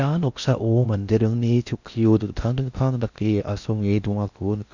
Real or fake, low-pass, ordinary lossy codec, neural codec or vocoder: fake; 7.2 kHz; none; codec, 16 kHz in and 24 kHz out, 0.6 kbps, FocalCodec, streaming, 4096 codes